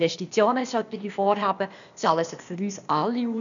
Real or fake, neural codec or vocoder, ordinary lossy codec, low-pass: fake; codec, 16 kHz, 0.8 kbps, ZipCodec; none; 7.2 kHz